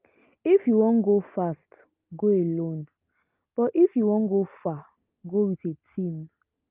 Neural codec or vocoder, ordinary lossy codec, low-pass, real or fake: none; Opus, 32 kbps; 3.6 kHz; real